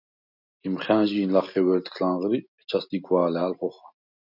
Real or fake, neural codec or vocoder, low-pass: real; none; 5.4 kHz